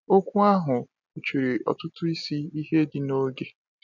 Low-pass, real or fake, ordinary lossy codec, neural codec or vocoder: 7.2 kHz; real; none; none